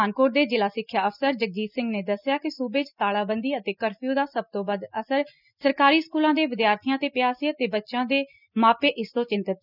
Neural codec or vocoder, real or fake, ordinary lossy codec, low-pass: none; real; none; 5.4 kHz